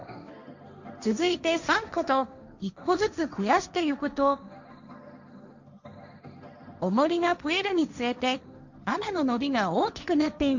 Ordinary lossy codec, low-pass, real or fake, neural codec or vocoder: none; 7.2 kHz; fake; codec, 16 kHz, 1.1 kbps, Voila-Tokenizer